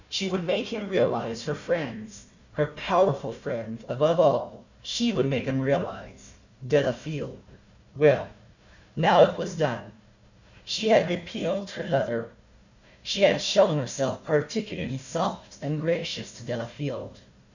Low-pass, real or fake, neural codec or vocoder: 7.2 kHz; fake; codec, 16 kHz, 1 kbps, FunCodec, trained on Chinese and English, 50 frames a second